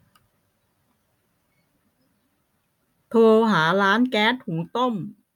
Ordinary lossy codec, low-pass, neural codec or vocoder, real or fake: none; 19.8 kHz; none; real